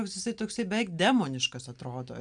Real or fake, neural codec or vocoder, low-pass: real; none; 9.9 kHz